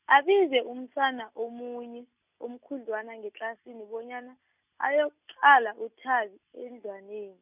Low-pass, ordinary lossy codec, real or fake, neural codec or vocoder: 3.6 kHz; none; real; none